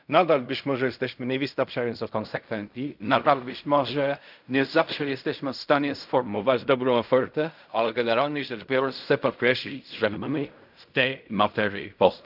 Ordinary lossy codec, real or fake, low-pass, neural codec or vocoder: none; fake; 5.4 kHz; codec, 16 kHz in and 24 kHz out, 0.4 kbps, LongCat-Audio-Codec, fine tuned four codebook decoder